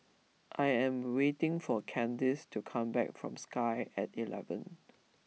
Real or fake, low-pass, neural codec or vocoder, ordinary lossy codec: real; none; none; none